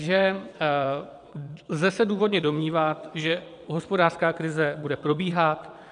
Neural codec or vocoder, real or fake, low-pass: vocoder, 22.05 kHz, 80 mel bands, WaveNeXt; fake; 9.9 kHz